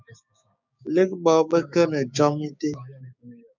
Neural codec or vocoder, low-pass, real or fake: codec, 16 kHz, 6 kbps, DAC; 7.2 kHz; fake